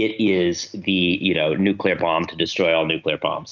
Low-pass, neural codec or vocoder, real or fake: 7.2 kHz; none; real